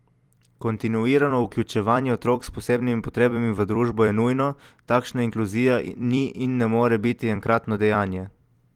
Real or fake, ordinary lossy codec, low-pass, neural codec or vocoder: fake; Opus, 24 kbps; 19.8 kHz; vocoder, 44.1 kHz, 128 mel bands every 256 samples, BigVGAN v2